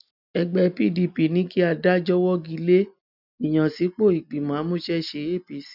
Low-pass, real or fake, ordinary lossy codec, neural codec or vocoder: 5.4 kHz; real; none; none